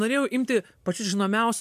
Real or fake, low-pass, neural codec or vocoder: real; 14.4 kHz; none